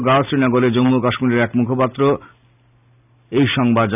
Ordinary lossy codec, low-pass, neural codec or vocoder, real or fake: none; 3.6 kHz; none; real